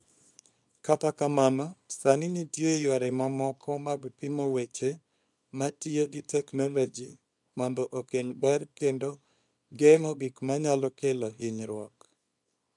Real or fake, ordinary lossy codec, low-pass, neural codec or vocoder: fake; none; 10.8 kHz; codec, 24 kHz, 0.9 kbps, WavTokenizer, small release